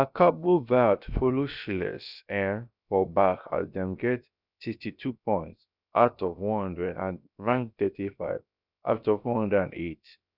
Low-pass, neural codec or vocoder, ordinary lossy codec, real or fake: 5.4 kHz; codec, 16 kHz, about 1 kbps, DyCAST, with the encoder's durations; none; fake